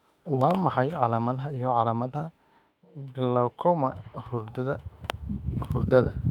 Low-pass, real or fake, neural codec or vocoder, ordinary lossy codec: 19.8 kHz; fake; autoencoder, 48 kHz, 32 numbers a frame, DAC-VAE, trained on Japanese speech; none